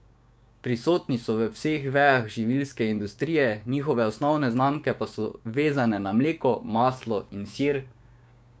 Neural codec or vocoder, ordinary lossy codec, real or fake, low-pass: codec, 16 kHz, 6 kbps, DAC; none; fake; none